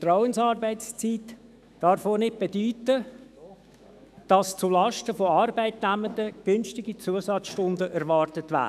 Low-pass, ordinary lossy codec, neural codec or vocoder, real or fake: 14.4 kHz; none; autoencoder, 48 kHz, 128 numbers a frame, DAC-VAE, trained on Japanese speech; fake